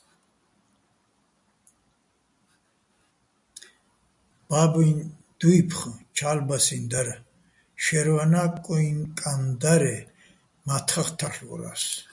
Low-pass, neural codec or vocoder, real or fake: 10.8 kHz; none; real